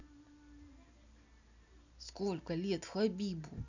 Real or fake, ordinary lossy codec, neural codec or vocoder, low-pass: real; none; none; 7.2 kHz